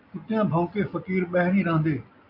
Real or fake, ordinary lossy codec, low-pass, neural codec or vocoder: real; AAC, 48 kbps; 5.4 kHz; none